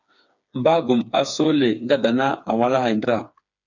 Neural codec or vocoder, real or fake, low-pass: codec, 16 kHz, 4 kbps, FreqCodec, smaller model; fake; 7.2 kHz